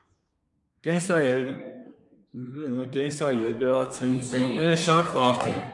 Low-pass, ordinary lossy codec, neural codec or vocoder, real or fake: 10.8 kHz; MP3, 96 kbps; codec, 24 kHz, 1 kbps, SNAC; fake